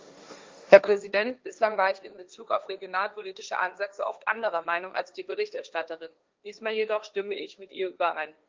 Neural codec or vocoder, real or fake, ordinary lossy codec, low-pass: codec, 16 kHz, 1.1 kbps, Voila-Tokenizer; fake; Opus, 32 kbps; 7.2 kHz